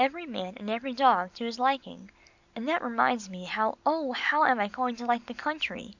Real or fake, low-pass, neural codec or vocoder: real; 7.2 kHz; none